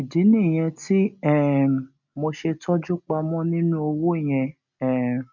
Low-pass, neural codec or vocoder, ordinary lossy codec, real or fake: 7.2 kHz; none; none; real